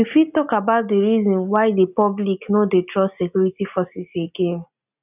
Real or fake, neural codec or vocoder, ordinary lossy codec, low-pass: real; none; none; 3.6 kHz